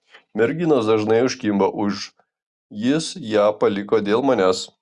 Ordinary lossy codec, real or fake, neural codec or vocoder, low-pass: Opus, 64 kbps; real; none; 10.8 kHz